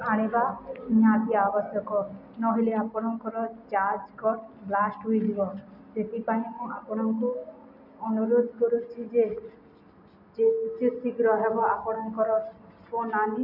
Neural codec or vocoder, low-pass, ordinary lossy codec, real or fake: none; 5.4 kHz; MP3, 48 kbps; real